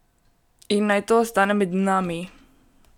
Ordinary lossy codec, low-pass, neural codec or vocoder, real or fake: none; 19.8 kHz; none; real